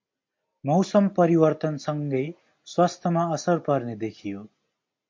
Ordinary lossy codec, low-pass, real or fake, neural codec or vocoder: MP3, 48 kbps; 7.2 kHz; real; none